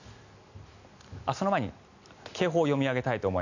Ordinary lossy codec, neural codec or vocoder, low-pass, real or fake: none; none; 7.2 kHz; real